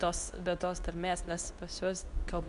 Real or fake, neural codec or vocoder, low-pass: fake; codec, 24 kHz, 0.9 kbps, WavTokenizer, medium speech release version 2; 10.8 kHz